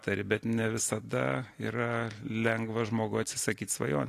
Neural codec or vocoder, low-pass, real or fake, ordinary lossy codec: none; 14.4 kHz; real; AAC, 48 kbps